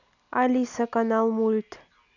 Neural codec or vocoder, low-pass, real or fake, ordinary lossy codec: none; 7.2 kHz; real; none